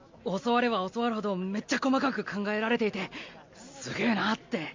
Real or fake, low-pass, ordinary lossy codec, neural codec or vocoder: real; 7.2 kHz; MP3, 48 kbps; none